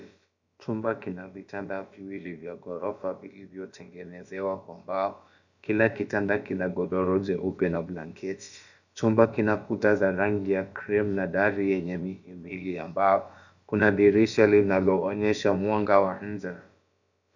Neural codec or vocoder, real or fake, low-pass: codec, 16 kHz, about 1 kbps, DyCAST, with the encoder's durations; fake; 7.2 kHz